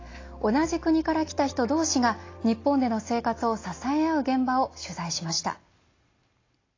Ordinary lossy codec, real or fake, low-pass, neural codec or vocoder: AAC, 32 kbps; real; 7.2 kHz; none